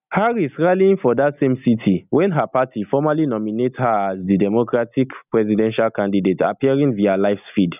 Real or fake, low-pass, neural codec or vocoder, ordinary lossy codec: real; 3.6 kHz; none; none